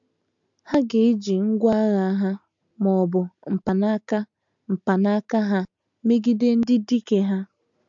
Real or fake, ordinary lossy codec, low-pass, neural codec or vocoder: real; AAC, 64 kbps; 7.2 kHz; none